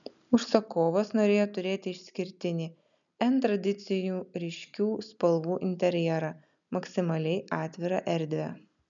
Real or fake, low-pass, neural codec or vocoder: real; 7.2 kHz; none